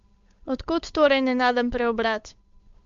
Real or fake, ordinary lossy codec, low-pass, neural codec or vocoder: fake; MP3, 64 kbps; 7.2 kHz; codec, 16 kHz, 8 kbps, FunCodec, trained on Chinese and English, 25 frames a second